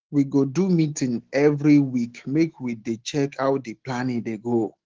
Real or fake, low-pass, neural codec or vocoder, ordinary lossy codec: fake; 7.2 kHz; autoencoder, 48 kHz, 128 numbers a frame, DAC-VAE, trained on Japanese speech; Opus, 16 kbps